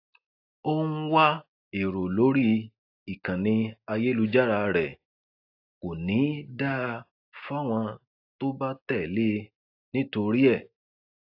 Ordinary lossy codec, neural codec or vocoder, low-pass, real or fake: AAC, 32 kbps; none; 5.4 kHz; real